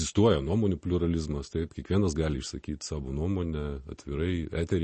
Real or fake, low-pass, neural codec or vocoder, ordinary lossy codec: real; 10.8 kHz; none; MP3, 32 kbps